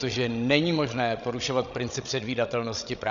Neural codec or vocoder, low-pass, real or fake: codec, 16 kHz, 16 kbps, FreqCodec, larger model; 7.2 kHz; fake